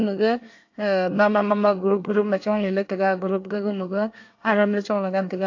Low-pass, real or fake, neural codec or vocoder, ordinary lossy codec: 7.2 kHz; fake; codec, 24 kHz, 1 kbps, SNAC; AAC, 48 kbps